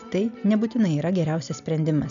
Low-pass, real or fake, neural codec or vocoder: 7.2 kHz; real; none